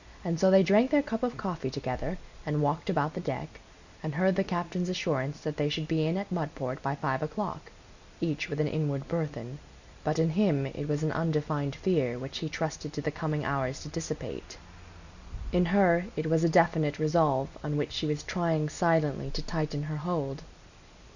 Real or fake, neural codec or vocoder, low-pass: real; none; 7.2 kHz